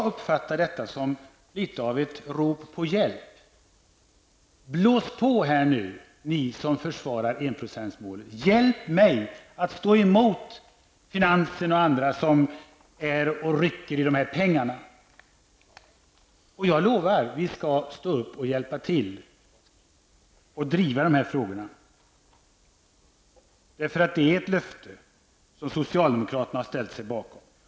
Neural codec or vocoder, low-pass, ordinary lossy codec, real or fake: none; none; none; real